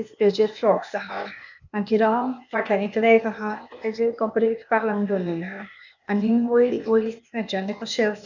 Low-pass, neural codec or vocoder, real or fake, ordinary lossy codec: 7.2 kHz; codec, 16 kHz, 0.8 kbps, ZipCodec; fake; none